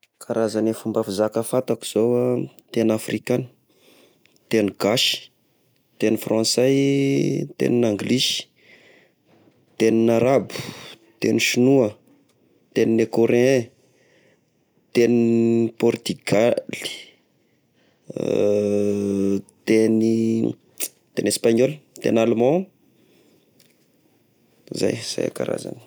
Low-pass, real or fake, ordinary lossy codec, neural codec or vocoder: none; real; none; none